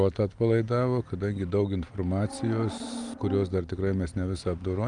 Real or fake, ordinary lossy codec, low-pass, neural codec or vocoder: real; Opus, 32 kbps; 9.9 kHz; none